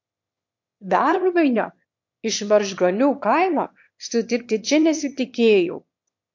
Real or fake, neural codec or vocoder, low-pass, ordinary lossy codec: fake; autoencoder, 22.05 kHz, a latent of 192 numbers a frame, VITS, trained on one speaker; 7.2 kHz; MP3, 48 kbps